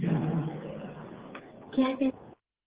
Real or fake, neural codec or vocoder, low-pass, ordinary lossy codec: fake; codec, 16 kHz, 8 kbps, FreqCodec, smaller model; 3.6 kHz; Opus, 16 kbps